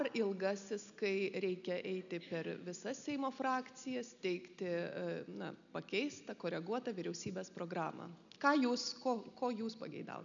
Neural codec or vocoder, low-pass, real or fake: none; 7.2 kHz; real